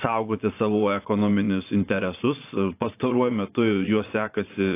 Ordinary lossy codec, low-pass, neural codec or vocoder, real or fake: AAC, 24 kbps; 3.6 kHz; vocoder, 22.05 kHz, 80 mel bands, Vocos; fake